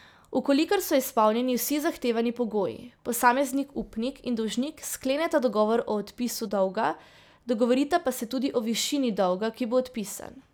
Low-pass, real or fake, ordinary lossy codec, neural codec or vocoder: none; real; none; none